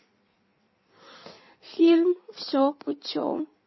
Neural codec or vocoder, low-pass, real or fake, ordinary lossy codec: codec, 16 kHz in and 24 kHz out, 1.1 kbps, FireRedTTS-2 codec; 7.2 kHz; fake; MP3, 24 kbps